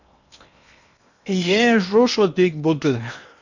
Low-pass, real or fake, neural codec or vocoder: 7.2 kHz; fake; codec, 16 kHz in and 24 kHz out, 0.8 kbps, FocalCodec, streaming, 65536 codes